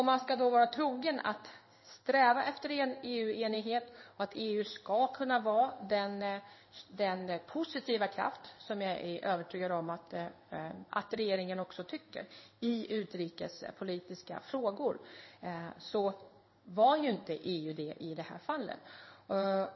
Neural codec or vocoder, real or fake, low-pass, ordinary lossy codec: codec, 16 kHz in and 24 kHz out, 1 kbps, XY-Tokenizer; fake; 7.2 kHz; MP3, 24 kbps